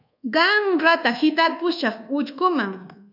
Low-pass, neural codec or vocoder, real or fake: 5.4 kHz; codec, 24 kHz, 1.2 kbps, DualCodec; fake